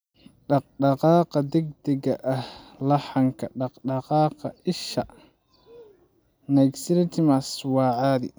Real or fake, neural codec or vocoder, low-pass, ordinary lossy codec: real; none; none; none